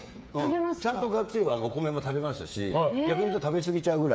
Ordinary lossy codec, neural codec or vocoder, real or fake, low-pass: none; codec, 16 kHz, 8 kbps, FreqCodec, smaller model; fake; none